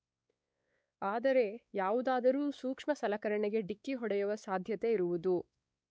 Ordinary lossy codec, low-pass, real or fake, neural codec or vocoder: none; none; fake; codec, 16 kHz, 4 kbps, X-Codec, WavLM features, trained on Multilingual LibriSpeech